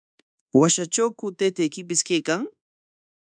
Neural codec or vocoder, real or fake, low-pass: codec, 24 kHz, 1.2 kbps, DualCodec; fake; 9.9 kHz